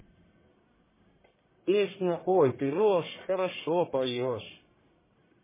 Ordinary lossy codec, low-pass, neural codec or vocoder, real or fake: MP3, 16 kbps; 3.6 kHz; codec, 44.1 kHz, 1.7 kbps, Pupu-Codec; fake